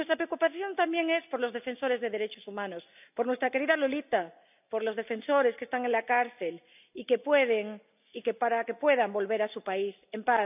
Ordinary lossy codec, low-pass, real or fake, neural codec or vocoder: none; 3.6 kHz; real; none